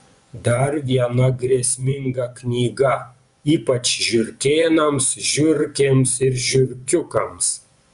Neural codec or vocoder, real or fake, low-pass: vocoder, 24 kHz, 100 mel bands, Vocos; fake; 10.8 kHz